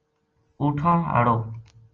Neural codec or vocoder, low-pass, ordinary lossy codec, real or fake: none; 7.2 kHz; Opus, 24 kbps; real